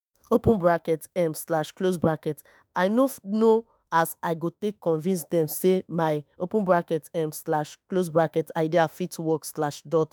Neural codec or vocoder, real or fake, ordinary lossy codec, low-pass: autoencoder, 48 kHz, 32 numbers a frame, DAC-VAE, trained on Japanese speech; fake; none; none